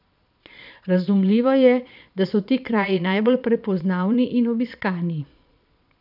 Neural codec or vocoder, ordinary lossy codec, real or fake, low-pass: vocoder, 22.05 kHz, 80 mel bands, Vocos; none; fake; 5.4 kHz